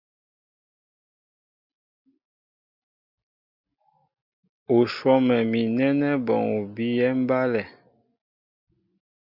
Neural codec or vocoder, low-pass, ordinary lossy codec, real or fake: none; 5.4 kHz; Opus, 64 kbps; real